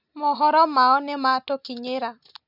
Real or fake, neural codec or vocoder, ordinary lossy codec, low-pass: real; none; none; 5.4 kHz